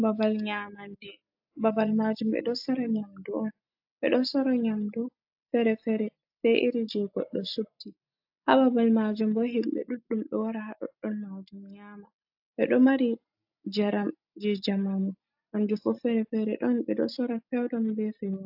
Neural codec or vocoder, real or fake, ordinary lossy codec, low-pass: none; real; AAC, 48 kbps; 5.4 kHz